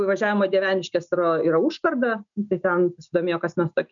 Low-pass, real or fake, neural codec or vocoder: 7.2 kHz; real; none